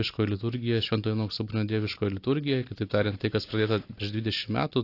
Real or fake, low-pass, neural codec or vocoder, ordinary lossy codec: real; 5.4 kHz; none; AAC, 32 kbps